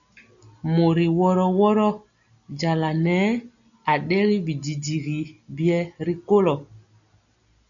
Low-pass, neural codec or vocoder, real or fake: 7.2 kHz; none; real